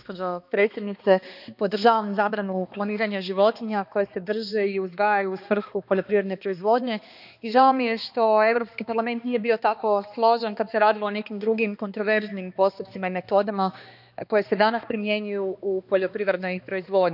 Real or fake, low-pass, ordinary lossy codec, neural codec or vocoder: fake; 5.4 kHz; none; codec, 16 kHz, 2 kbps, X-Codec, HuBERT features, trained on balanced general audio